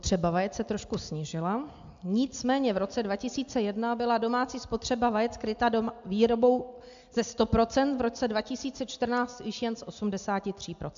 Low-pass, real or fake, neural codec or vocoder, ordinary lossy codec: 7.2 kHz; real; none; AAC, 64 kbps